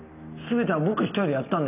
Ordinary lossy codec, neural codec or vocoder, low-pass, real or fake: none; none; 3.6 kHz; real